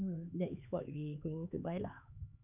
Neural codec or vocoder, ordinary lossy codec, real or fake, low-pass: codec, 16 kHz, 4 kbps, X-Codec, HuBERT features, trained on LibriSpeech; none; fake; 3.6 kHz